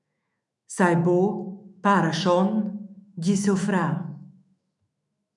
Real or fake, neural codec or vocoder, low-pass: fake; autoencoder, 48 kHz, 128 numbers a frame, DAC-VAE, trained on Japanese speech; 10.8 kHz